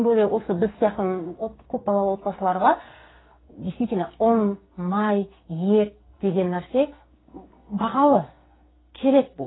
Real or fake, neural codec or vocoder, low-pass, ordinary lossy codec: fake; codec, 44.1 kHz, 2.6 kbps, SNAC; 7.2 kHz; AAC, 16 kbps